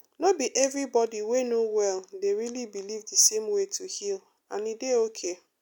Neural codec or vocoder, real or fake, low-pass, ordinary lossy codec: none; real; 19.8 kHz; none